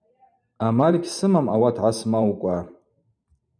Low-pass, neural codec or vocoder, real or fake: 9.9 kHz; vocoder, 44.1 kHz, 128 mel bands every 512 samples, BigVGAN v2; fake